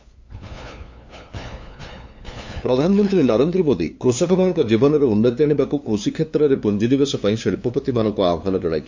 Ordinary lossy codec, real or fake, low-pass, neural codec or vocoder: MP3, 48 kbps; fake; 7.2 kHz; codec, 16 kHz, 2 kbps, FunCodec, trained on LibriTTS, 25 frames a second